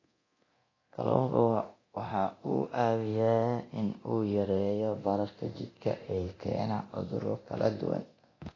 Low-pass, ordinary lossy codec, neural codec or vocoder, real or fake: 7.2 kHz; AAC, 32 kbps; codec, 24 kHz, 0.9 kbps, DualCodec; fake